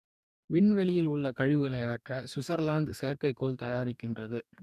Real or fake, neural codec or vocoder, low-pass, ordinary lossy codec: fake; codec, 44.1 kHz, 2.6 kbps, DAC; 14.4 kHz; none